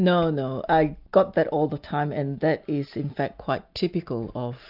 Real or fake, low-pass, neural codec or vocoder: real; 5.4 kHz; none